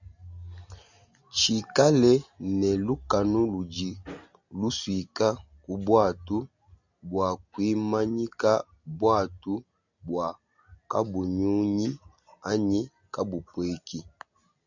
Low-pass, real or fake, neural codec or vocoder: 7.2 kHz; real; none